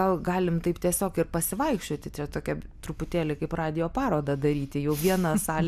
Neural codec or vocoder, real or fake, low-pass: none; real; 14.4 kHz